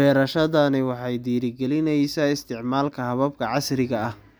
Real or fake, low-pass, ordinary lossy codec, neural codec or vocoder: real; none; none; none